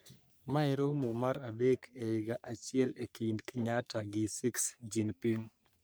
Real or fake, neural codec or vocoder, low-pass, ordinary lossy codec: fake; codec, 44.1 kHz, 3.4 kbps, Pupu-Codec; none; none